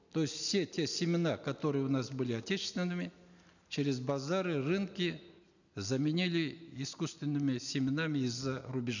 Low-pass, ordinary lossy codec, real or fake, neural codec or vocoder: 7.2 kHz; none; real; none